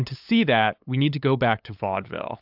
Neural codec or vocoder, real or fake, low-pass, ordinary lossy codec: codec, 16 kHz, 8 kbps, FunCodec, trained on LibriTTS, 25 frames a second; fake; 5.4 kHz; AAC, 48 kbps